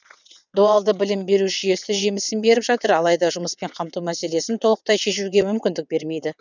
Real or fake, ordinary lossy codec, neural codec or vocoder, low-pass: fake; none; vocoder, 22.05 kHz, 80 mel bands, WaveNeXt; 7.2 kHz